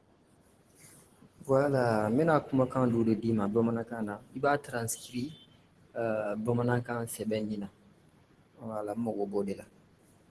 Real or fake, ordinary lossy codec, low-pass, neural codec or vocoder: fake; Opus, 16 kbps; 10.8 kHz; vocoder, 44.1 kHz, 128 mel bands every 512 samples, BigVGAN v2